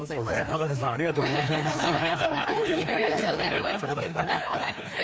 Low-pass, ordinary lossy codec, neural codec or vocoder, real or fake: none; none; codec, 16 kHz, 2 kbps, FreqCodec, larger model; fake